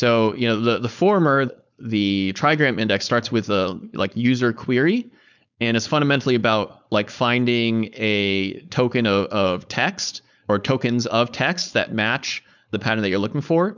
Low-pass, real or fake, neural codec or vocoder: 7.2 kHz; fake; codec, 16 kHz, 4.8 kbps, FACodec